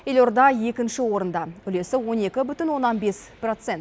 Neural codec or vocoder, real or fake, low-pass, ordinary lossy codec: none; real; none; none